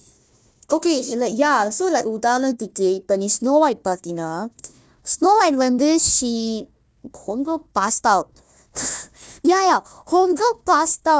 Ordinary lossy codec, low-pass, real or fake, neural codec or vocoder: none; none; fake; codec, 16 kHz, 1 kbps, FunCodec, trained on Chinese and English, 50 frames a second